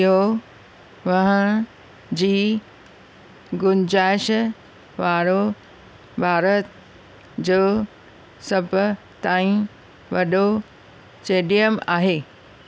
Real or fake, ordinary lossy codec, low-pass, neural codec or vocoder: real; none; none; none